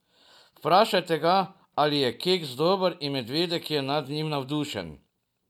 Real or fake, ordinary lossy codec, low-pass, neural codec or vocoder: real; none; 19.8 kHz; none